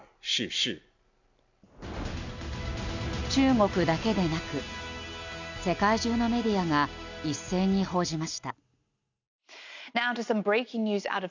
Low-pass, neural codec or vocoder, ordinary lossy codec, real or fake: 7.2 kHz; none; none; real